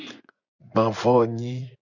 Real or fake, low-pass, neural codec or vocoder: fake; 7.2 kHz; vocoder, 24 kHz, 100 mel bands, Vocos